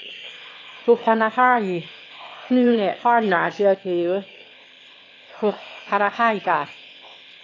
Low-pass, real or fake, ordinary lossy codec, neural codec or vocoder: 7.2 kHz; fake; AAC, 32 kbps; autoencoder, 22.05 kHz, a latent of 192 numbers a frame, VITS, trained on one speaker